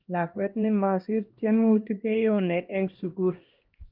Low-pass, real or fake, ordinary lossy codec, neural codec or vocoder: 5.4 kHz; fake; Opus, 32 kbps; codec, 16 kHz, 1 kbps, X-Codec, HuBERT features, trained on LibriSpeech